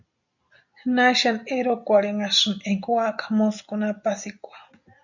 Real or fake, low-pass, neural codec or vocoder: real; 7.2 kHz; none